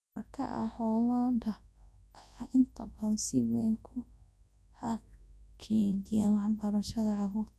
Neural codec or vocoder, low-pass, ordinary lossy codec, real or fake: codec, 24 kHz, 0.9 kbps, WavTokenizer, large speech release; none; none; fake